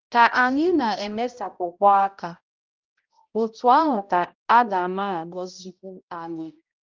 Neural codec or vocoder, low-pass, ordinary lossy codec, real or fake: codec, 16 kHz, 0.5 kbps, X-Codec, HuBERT features, trained on balanced general audio; 7.2 kHz; Opus, 24 kbps; fake